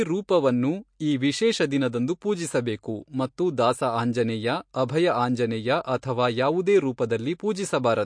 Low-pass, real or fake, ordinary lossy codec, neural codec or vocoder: 9.9 kHz; real; MP3, 48 kbps; none